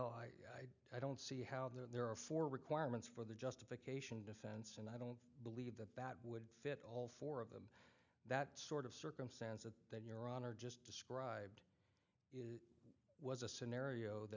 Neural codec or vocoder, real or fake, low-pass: none; real; 7.2 kHz